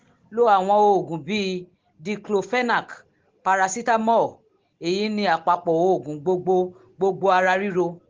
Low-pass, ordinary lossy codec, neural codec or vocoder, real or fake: 7.2 kHz; Opus, 16 kbps; none; real